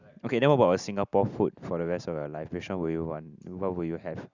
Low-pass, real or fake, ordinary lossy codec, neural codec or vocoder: 7.2 kHz; real; Opus, 64 kbps; none